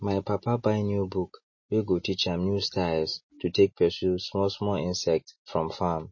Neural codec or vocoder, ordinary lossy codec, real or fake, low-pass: none; MP3, 32 kbps; real; 7.2 kHz